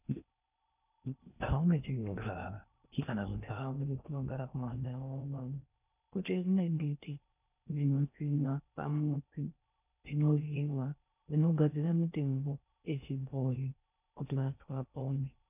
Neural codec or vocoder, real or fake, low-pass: codec, 16 kHz in and 24 kHz out, 0.6 kbps, FocalCodec, streaming, 4096 codes; fake; 3.6 kHz